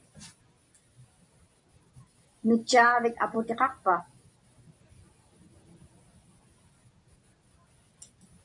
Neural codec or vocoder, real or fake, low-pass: none; real; 10.8 kHz